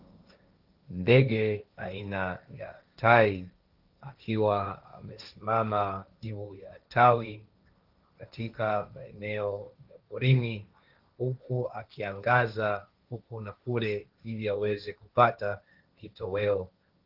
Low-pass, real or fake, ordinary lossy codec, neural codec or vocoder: 5.4 kHz; fake; Opus, 24 kbps; codec, 16 kHz, 1.1 kbps, Voila-Tokenizer